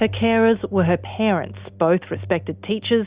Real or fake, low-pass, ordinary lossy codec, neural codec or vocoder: real; 3.6 kHz; Opus, 64 kbps; none